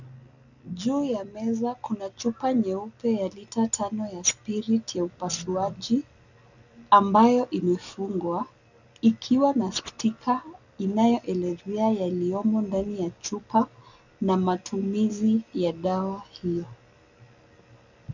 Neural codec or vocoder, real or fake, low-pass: none; real; 7.2 kHz